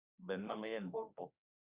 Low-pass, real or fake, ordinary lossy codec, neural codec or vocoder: 3.6 kHz; fake; Opus, 64 kbps; autoencoder, 48 kHz, 32 numbers a frame, DAC-VAE, trained on Japanese speech